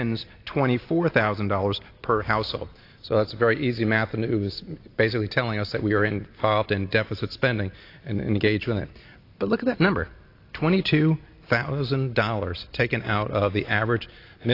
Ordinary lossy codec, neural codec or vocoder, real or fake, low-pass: AAC, 32 kbps; none; real; 5.4 kHz